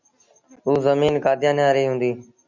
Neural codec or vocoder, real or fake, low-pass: none; real; 7.2 kHz